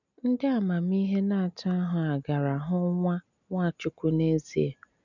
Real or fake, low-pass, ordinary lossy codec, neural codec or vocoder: real; 7.2 kHz; none; none